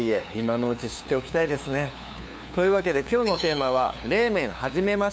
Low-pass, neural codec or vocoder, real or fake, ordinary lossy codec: none; codec, 16 kHz, 2 kbps, FunCodec, trained on LibriTTS, 25 frames a second; fake; none